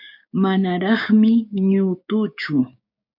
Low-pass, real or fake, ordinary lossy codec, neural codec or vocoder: 5.4 kHz; fake; MP3, 48 kbps; codec, 16 kHz, 6 kbps, DAC